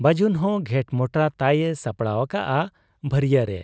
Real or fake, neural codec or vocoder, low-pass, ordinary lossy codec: real; none; none; none